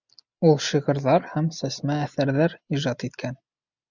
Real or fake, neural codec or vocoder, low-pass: real; none; 7.2 kHz